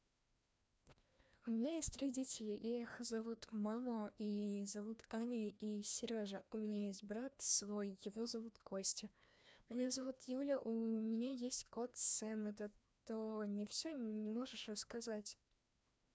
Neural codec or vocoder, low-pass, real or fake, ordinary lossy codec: codec, 16 kHz, 1 kbps, FreqCodec, larger model; none; fake; none